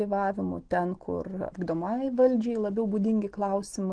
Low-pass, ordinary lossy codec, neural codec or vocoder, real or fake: 9.9 kHz; Opus, 16 kbps; none; real